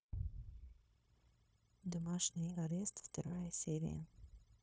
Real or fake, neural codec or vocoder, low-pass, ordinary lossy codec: fake; codec, 16 kHz, 0.9 kbps, LongCat-Audio-Codec; none; none